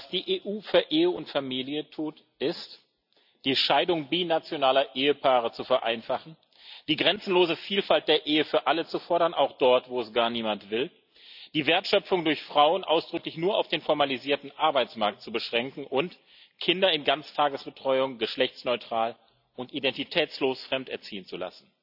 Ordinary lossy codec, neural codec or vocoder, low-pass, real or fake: none; none; 5.4 kHz; real